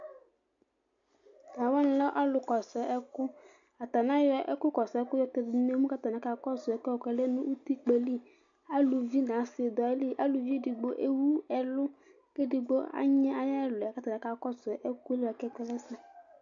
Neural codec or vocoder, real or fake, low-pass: none; real; 7.2 kHz